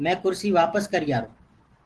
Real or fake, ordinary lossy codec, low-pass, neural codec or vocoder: real; Opus, 16 kbps; 10.8 kHz; none